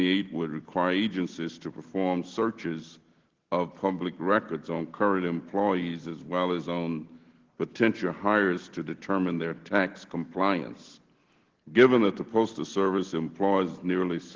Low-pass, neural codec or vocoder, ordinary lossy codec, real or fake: 7.2 kHz; none; Opus, 16 kbps; real